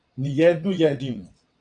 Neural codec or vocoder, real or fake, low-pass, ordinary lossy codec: vocoder, 22.05 kHz, 80 mel bands, WaveNeXt; fake; 9.9 kHz; AAC, 64 kbps